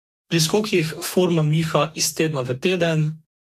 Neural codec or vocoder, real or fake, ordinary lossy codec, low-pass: codec, 44.1 kHz, 2.6 kbps, DAC; fake; MP3, 64 kbps; 14.4 kHz